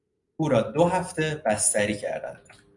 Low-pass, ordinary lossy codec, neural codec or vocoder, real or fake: 10.8 kHz; MP3, 96 kbps; none; real